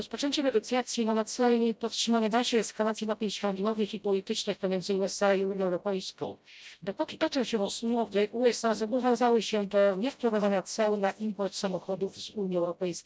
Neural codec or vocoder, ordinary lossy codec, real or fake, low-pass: codec, 16 kHz, 0.5 kbps, FreqCodec, smaller model; none; fake; none